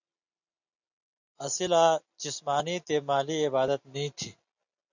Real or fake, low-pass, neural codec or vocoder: real; 7.2 kHz; none